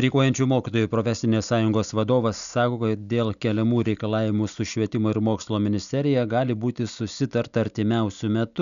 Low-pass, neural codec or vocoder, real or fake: 7.2 kHz; none; real